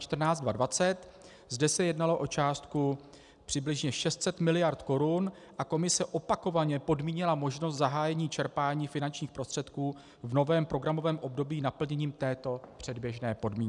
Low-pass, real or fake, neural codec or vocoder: 10.8 kHz; real; none